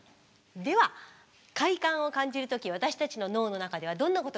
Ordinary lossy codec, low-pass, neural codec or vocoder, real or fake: none; none; none; real